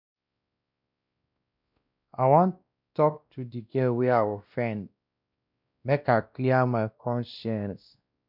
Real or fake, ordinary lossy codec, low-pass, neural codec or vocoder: fake; none; 5.4 kHz; codec, 16 kHz, 1 kbps, X-Codec, WavLM features, trained on Multilingual LibriSpeech